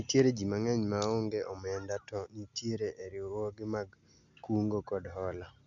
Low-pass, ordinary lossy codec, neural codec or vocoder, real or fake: 7.2 kHz; none; none; real